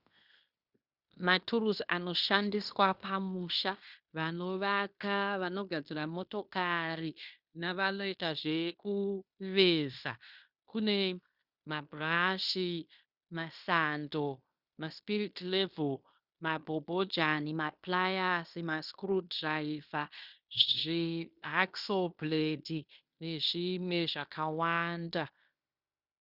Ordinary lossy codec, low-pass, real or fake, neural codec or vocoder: Opus, 64 kbps; 5.4 kHz; fake; codec, 16 kHz in and 24 kHz out, 0.9 kbps, LongCat-Audio-Codec, fine tuned four codebook decoder